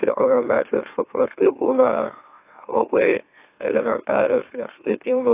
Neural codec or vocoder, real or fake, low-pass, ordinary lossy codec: autoencoder, 44.1 kHz, a latent of 192 numbers a frame, MeloTTS; fake; 3.6 kHz; AAC, 24 kbps